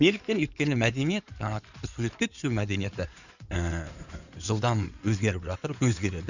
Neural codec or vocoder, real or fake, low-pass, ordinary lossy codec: codec, 16 kHz in and 24 kHz out, 2.2 kbps, FireRedTTS-2 codec; fake; 7.2 kHz; none